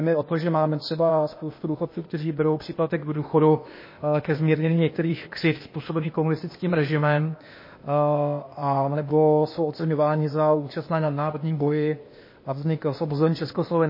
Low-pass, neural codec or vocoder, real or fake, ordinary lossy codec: 5.4 kHz; codec, 16 kHz, 0.8 kbps, ZipCodec; fake; MP3, 24 kbps